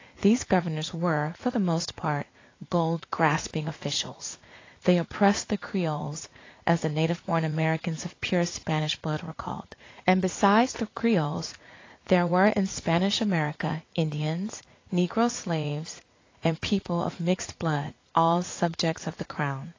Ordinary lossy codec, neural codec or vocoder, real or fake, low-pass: AAC, 32 kbps; vocoder, 44.1 kHz, 80 mel bands, Vocos; fake; 7.2 kHz